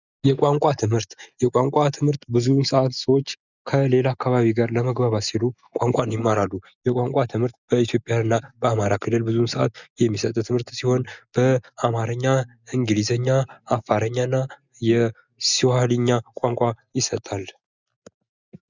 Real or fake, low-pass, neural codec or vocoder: real; 7.2 kHz; none